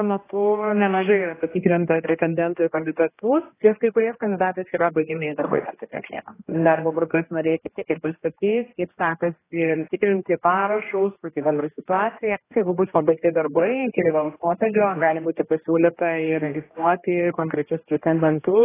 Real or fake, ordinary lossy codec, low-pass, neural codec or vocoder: fake; AAC, 16 kbps; 3.6 kHz; codec, 16 kHz, 1 kbps, X-Codec, HuBERT features, trained on general audio